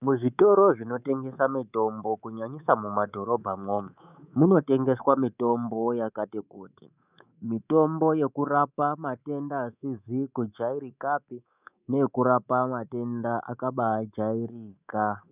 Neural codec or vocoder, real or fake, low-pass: codec, 24 kHz, 3.1 kbps, DualCodec; fake; 3.6 kHz